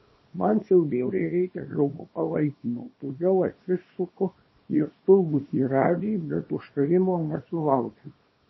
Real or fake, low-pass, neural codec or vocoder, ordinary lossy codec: fake; 7.2 kHz; codec, 24 kHz, 0.9 kbps, WavTokenizer, small release; MP3, 24 kbps